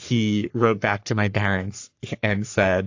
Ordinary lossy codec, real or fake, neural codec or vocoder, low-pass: AAC, 48 kbps; fake; codec, 44.1 kHz, 3.4 kbps, Pupu-Codec; 7.2 kHz